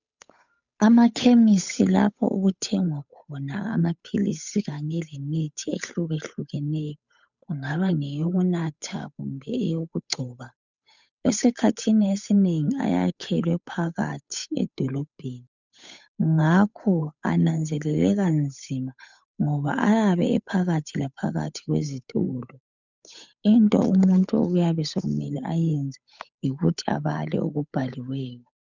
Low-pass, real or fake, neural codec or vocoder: 7.2 kHz; fake; codec, 16 kHz, 8 kbps, FunCodec, trained on Chinese and English, 25 frames a second